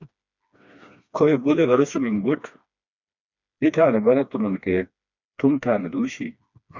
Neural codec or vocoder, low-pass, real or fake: codec, 16 kHz, 2 kbps, FreqCodec, smaller model; 7.2 kHz; fake